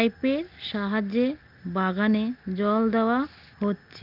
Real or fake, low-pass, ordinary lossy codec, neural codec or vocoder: real; 5.4 kHz; Opus, 24 kbps; none